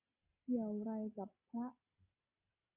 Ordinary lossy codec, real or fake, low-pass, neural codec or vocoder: MP3, 32 kbps; real; 3.6 kHz; none